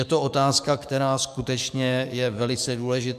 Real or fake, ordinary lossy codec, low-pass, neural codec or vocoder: fake; MP3, 96 kbps; 14.4 kHz; codec, 44.1 kHz, 7.8 kbps, DAC